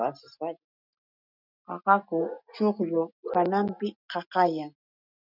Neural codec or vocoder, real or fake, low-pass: none; real; 5.4 kHz